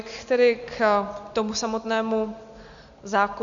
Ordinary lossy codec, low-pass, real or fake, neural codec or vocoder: Opus, 64 kbps; 7.2 kHz; real; none